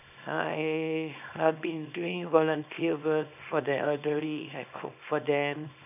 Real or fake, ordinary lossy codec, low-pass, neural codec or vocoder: fake; none; 3.6 kHz; codec, 24 kHz, 0.9 kbps, WavTokenizer, small release